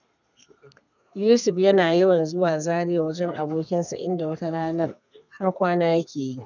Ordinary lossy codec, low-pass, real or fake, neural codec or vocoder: none; 7.2 kHz; fake; codec, 32 kHz, 1.9 kbps, SNAC